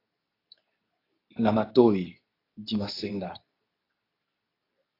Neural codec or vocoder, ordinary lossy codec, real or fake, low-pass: codec, 24 kHz, 0.9 kbps, WavTokenizer, medium speech release version 2; AAC, 24 kbps; fake; 5.4 kHz